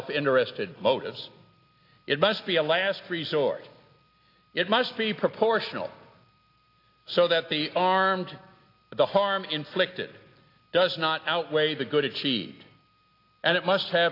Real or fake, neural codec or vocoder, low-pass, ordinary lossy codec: real; none; 5.4 kHz; AAC, 32 kbps